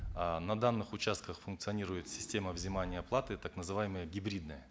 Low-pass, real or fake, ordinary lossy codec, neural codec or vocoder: none; real; none; none